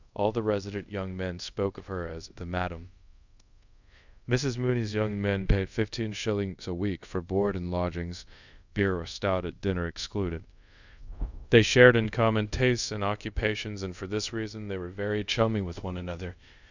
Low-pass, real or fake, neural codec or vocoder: 7.2 kHz; fake; codec, 24 kHz, 0.5 kbps, DualCodec